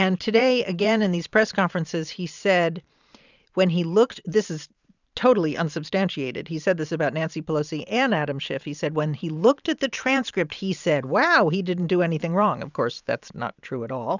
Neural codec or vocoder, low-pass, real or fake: vocoder, 44.1 kHz, 128 mel bands every 512 samples, BigVGAN v2; 7.2 kHz; fake